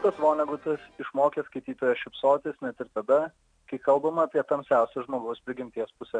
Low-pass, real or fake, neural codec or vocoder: 9.9 kHz; real; none